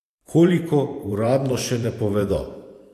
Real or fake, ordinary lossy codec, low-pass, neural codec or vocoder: fake; AAC, 64 kbps; 14.4 kHz; vocoder, 44.1 kHz, 128 mel bands every 512 samples, BigVGAN v2